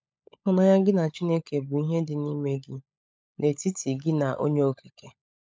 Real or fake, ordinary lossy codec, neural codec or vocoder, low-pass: fake; none; codec, 16 kHz, 16 kbps, FunCodec, trained on LibriTTS, 50 frames a second; none